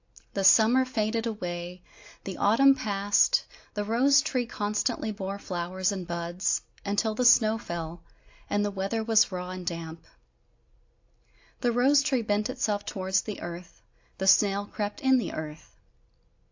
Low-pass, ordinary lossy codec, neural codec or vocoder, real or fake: 7.2 kHz; AAC, 48 kbps; none; real